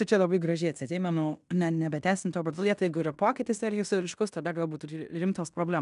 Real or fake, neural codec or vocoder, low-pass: fake; codec, 16 kHz in and 24 kHz out, 0.9 kbps, LongCat-Audio-Codec, fine tuned four codebook decoder; 10.8 kHz